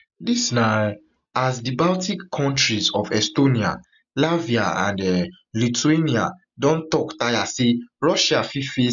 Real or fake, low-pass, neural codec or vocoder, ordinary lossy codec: real; 7.2 kHz; none; MP3, 96 kbps